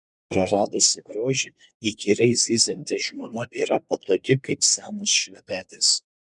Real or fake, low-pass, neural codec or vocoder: fake; 10.8 kHz; codec, 24 kHz, 1 kbps, SNAC